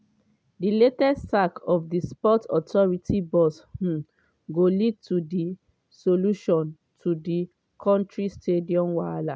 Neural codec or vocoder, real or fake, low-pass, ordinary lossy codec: none; real; none; none